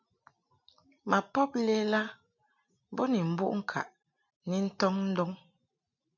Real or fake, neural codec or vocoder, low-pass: real; none; 7.2 kHz